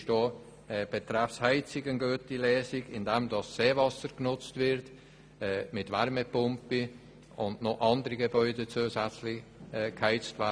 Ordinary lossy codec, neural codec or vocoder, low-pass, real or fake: none; none; none; real